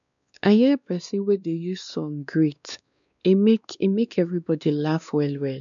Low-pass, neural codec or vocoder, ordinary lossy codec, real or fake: 7.2 kHz; codec, 16 kHz, 2 kbps, X-Codec, WavLM features, trained on Multilingual LibriSpeech; none; fake